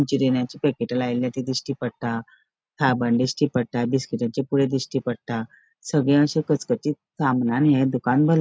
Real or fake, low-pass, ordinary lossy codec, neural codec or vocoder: real; none; none; none